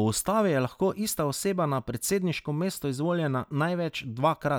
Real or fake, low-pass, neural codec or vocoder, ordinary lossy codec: real; none; none; none